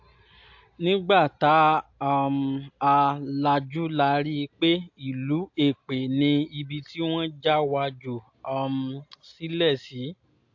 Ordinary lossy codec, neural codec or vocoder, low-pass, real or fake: AAC, 48 kbps; none; 7.2 kHz; real